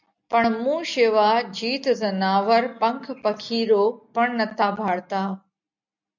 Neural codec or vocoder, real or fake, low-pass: none; real; 7.2 kHz